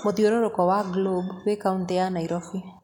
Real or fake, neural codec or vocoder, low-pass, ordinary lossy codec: real; none; 19.8 kHz; none